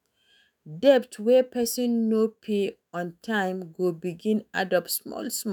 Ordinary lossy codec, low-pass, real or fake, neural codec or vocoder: none; none; fake; autoencoder, 48 kHz, 128 numbers a frame, DAC-VAE, trained on Japanese speech